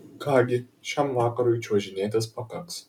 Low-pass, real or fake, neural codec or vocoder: 19.8 kHz; real; none